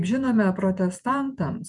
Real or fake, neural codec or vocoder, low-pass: fake; vocoder, 48 kHz, 128 mel bands, Vocos; 10.8 kHz